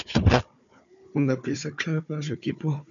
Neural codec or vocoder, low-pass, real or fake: codec, 16 kHz, 4 kbps, FunCodec, trained on Chinese and English, 50 frames a second; 7.2 kHz; fake